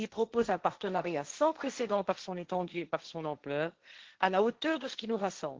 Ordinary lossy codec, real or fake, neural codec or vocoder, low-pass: Opus, 16 kbps; fake; codec, 16 kHz, 1.1 kbps, Voila-Tokenizer; 7.2 kHz